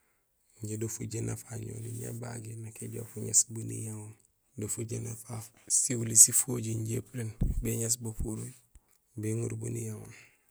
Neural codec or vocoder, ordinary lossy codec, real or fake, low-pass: none; none; real; none